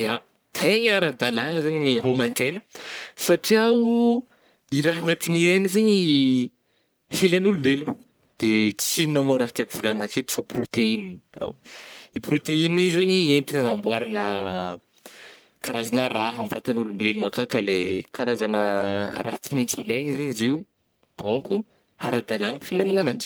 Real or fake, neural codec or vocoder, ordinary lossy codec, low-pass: fake; codec, 44.1 kHz, 1.7 kbps, Pupu-Codec; none; none